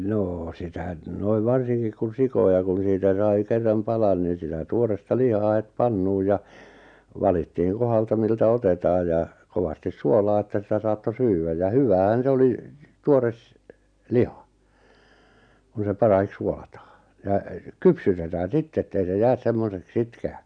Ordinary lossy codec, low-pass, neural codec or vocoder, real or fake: none; 9.9 kHz; none; real